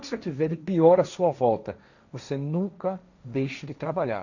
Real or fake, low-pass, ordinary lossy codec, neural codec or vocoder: fake; 7.2 kHz; none; codec, 16 kHz, 1.1 kbps, Voila-Tokenizer